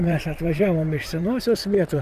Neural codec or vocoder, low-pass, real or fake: vocoder, 44.1 kHz, 128 mel bands, Pupu-Vocoder; 14.4 kHz; fake